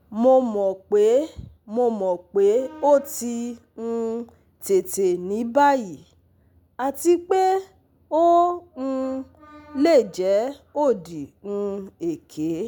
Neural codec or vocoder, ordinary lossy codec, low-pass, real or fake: none; none; none; real